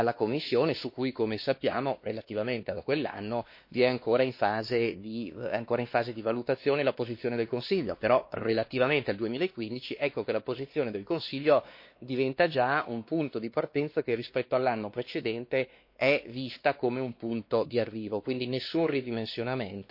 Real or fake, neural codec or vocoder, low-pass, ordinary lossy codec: fake; codec, 16 kHz, 2 kbps, X-Codec, WavLM features, trained on Multilingual LibriSpeech; 5.4 kHz; MP3, 32 kbps